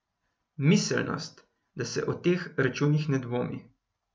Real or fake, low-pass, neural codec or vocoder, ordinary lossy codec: real; none; none; none